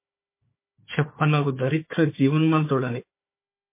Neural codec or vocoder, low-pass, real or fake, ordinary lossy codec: codec, 16 kHz, 4 kbps, FunCodec, trained on Chinese and English, 50 frames a second; 3.6 kHz; fake; MP3, 24 kbps